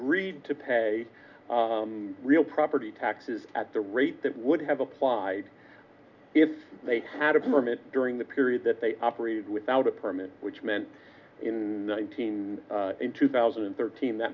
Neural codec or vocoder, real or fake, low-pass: none; real; 7.2 kHz